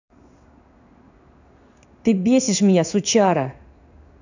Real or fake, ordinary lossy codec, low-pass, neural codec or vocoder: fake; none; 7.2 kHz; codec, 16 kHz in and 24 kHz out, 1 kbps, XY-Tokenizer